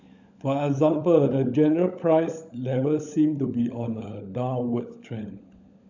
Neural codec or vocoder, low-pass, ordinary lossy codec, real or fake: codec, 16 kHz, 16 kbps, FunCodec, trained on LibriTTS, 50 frames a second; 7.2 kHz; none; fake